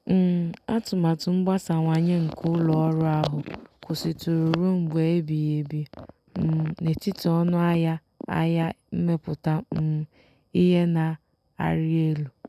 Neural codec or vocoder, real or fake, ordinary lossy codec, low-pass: none; real; none; 14.4 kHz